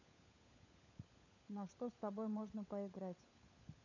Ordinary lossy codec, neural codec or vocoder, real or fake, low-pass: none; codec, 16 kHz, 16 kbps, FunCodec, trained on LibriTTS, 50 frames a second; fake; 7.2 kHz